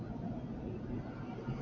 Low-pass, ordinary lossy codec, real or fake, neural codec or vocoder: 7.2 kHz; MP3, 64 kbps; real; none